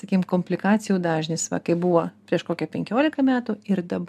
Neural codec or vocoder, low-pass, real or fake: none; 14.4 kHz; real